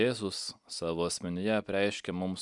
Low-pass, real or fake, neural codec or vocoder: 10.8 kHz; real; none